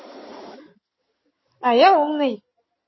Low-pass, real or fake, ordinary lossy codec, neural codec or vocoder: 7.2 kHz; fake; MP3, 24 kbps; codec, 16 kHz in and 24 kHz out, 2.2 kbps, FireRedTTS-2 codec